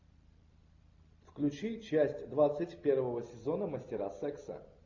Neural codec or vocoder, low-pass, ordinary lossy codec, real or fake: none; 7.2 kHz; MP3, 64 kbps; real